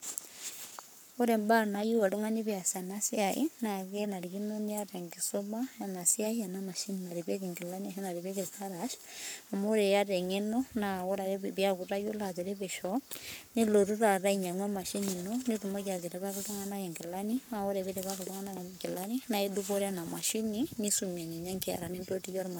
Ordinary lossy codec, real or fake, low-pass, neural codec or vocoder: none; fake; none; codec, 44.1 kHz, 7.8 kbps, Pupu-Codec